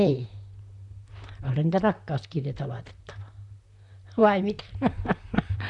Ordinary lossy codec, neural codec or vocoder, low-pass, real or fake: none; vocoder, 44.1 kHz, 128 mel bands, Pupu-Vocoder; 10.8 kHz; fake